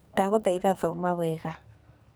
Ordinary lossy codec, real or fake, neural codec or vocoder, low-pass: none; fake; codec, 44.1 kHz, 2.6 kbps, SNAC; none